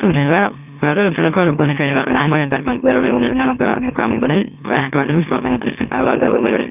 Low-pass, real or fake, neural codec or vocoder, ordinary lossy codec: 3.6 kHz; fake; autoencoder, 44.1 kHz, a latent of 192 numbers a frame, MeloTTS; none